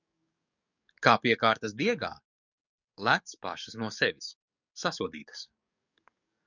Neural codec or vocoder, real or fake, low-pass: codec, 44.1 kHz, 7.8 kbps, DAC; fake; 7.2 kHz